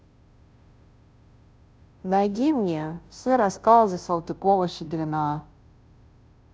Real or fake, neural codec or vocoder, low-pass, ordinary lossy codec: fake; codec, 16 kHz, 0.5 kbps, FunCodec, trained on Chinese and English, 25 frames a second; none; none